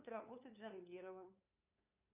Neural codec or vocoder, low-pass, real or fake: codec, 16 kHz, 8 kbps, FunCodec, trained on LibriTTS, 25 frames a second; 3.6 kHz; fake